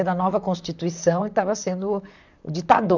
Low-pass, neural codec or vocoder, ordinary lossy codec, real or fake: 7.2 kHz; vocoder, 44.1 kHz, 128 mel bands, Pupu-Vocoder; none; fake